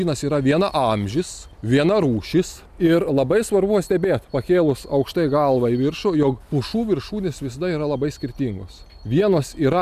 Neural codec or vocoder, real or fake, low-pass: none; real; 14.4 kHz